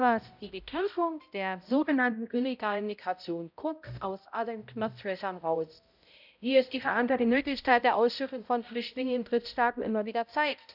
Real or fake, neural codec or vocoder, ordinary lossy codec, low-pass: fake; codec, 16 kHz, 0.5 kbps, X-Codec, HuBERT features, trained on balanced general audio; none; 5.4 kHz